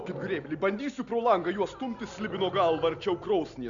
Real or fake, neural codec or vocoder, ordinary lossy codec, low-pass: real; none; MP3, 96 kbps; 7.2 kHz